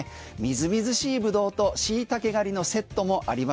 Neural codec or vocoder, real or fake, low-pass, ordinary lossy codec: none; real; none; none